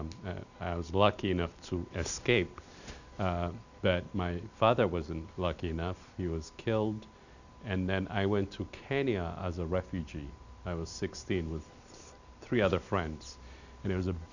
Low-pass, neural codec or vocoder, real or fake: 7.2 kHz; none; real